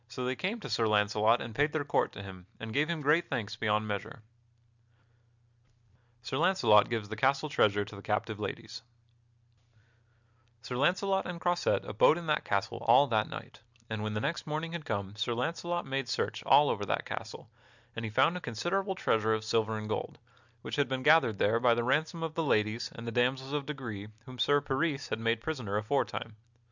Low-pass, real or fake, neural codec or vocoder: 7.2 kHz; real; none